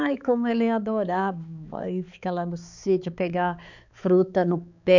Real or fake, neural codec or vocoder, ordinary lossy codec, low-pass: fake; codec, 16 kHz, 4 kbps, X-Codec, HuBERT features, trained on balanced general audio; none; 7.2 kHz